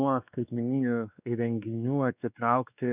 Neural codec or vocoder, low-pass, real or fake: codec, 16 kHz, 2 kbps, X-Codec, WavLM features, trained on Multilingual LibriSpeech; 3.6 kHz; fake